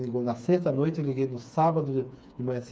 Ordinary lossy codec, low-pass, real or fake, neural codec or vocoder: none; none; fake; codec, 16 kHz, 4 kbps, FreqCodec, smaller model